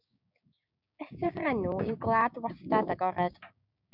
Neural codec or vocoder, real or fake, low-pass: codec, 16 kHz, 6 kbps, DAC; fake; 5.4 kHz